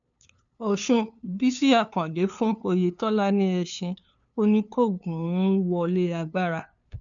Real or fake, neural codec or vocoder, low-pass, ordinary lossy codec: fake; codec, 16 kHz, 2 kbps, FunCodec, trained on LibriTTS, 25 frames a second; 7.2 kHz; none